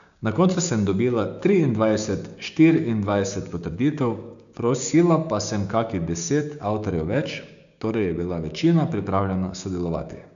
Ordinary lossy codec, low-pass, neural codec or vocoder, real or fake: none; 7.2 kHz; codec, 16 kHz, 6 kbps, DAC; fake